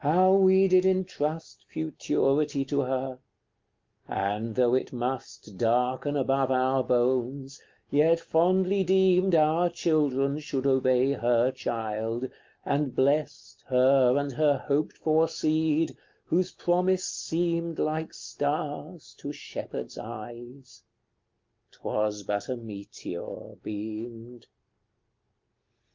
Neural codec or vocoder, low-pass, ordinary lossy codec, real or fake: none; 7.2 kHz; Opus, 24 kbps; real